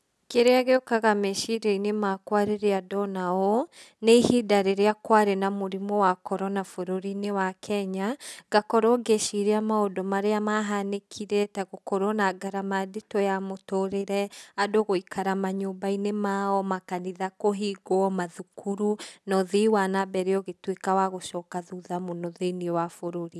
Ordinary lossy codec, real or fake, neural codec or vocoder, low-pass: none; real; none; none